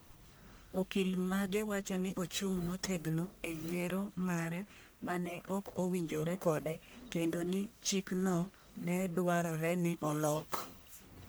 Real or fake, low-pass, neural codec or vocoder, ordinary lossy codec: fake; none; codec, 44.1 kHz, 1.7 kbps, Pupu-Codec; none